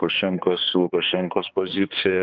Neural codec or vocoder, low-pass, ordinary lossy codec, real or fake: codec, 16 kHz, 2 kbps, X-Codec, HuBERT features, trained on balanced general audio; 7.2 kHz; Opus, 24 kbps; fake